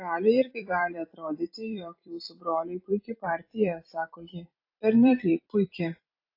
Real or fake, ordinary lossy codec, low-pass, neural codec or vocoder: fake; AAC, 32 kbps; 5.4 kHz; vocoder, 44.1 kHz, 128 mel bands every 256 samples, BigVGAN v2